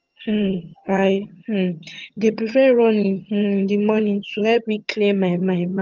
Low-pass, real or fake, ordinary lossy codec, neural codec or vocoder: 7.2 kHz; fake; Opus, 24 kbps; vocoder, 22.05 kHz, 80 mel bands, HiFi-GAN